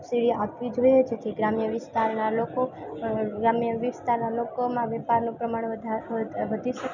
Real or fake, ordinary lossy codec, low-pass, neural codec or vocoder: real; none; 7.2 kHz; none